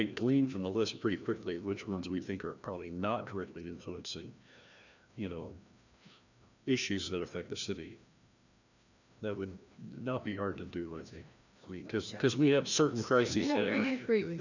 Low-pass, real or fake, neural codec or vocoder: 7.2 kHz; fake; codec, 16 kHz, 1 kbps, FreqCodec, larger model